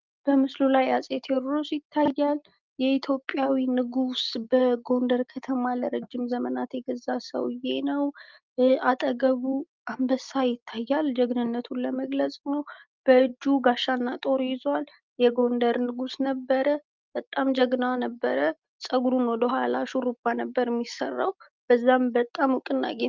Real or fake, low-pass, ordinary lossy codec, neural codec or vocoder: real; 7.2 kHz; Opus, 24 kbps; none